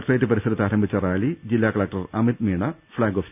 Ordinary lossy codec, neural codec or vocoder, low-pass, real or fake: none; none; 3.6 kHz; real